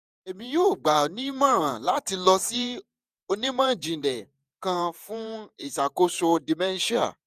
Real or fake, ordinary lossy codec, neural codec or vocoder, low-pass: fake; none; vocoder, 48 kHz, 128 mel bands, Vocos; 14.4 kHz